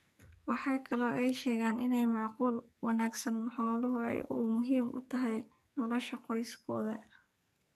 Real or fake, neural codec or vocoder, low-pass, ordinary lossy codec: fake; codec, 44.1 kHz, 2.6 kbps, SNAC; 14.4 kHz; none